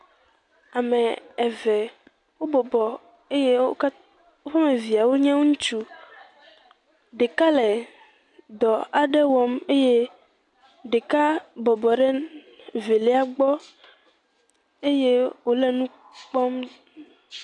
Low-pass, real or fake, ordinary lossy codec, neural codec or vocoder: 10.8 kHz; real; MP3, 96 kbps; none